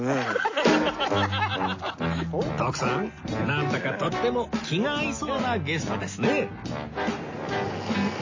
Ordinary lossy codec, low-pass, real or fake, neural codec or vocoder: MP3, 32 kbps; 7.2 kHz; real; none